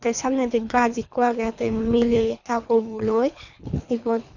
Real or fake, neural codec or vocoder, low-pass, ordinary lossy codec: fake; codec, 24 kHz, 3 kbps, HILCodec; 7.2 kHz; none